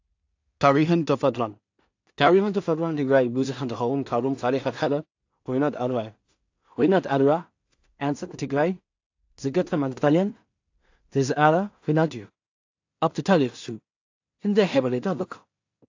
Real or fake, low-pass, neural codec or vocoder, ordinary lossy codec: fake; 7.2 kHz; codec, 16 kHz in and 24 kHz out, 0.4 kbps, LongCat-Audio-Codec, two codebook decoder; AAC, 48 kbps